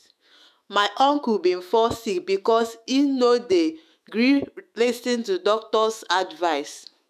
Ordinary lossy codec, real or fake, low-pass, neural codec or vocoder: none; fake; 14.4 kHz; autoencoder, 48 kHz, 128 numbers a frame, DAC-VAE, trained on Japanese speech